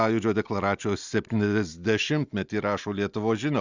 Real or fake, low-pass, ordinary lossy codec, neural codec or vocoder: real; 7.2 kHz; Opus, 64 kbps; none